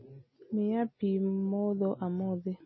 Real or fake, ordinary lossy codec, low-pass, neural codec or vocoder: real; MP3, 24 kbps; 7.2 kHz; none